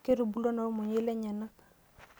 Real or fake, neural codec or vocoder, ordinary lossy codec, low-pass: real; none; none; none